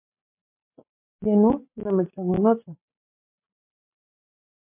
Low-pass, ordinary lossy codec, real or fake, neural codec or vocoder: 3.6 kHz; MP3, 32 kbps; real; none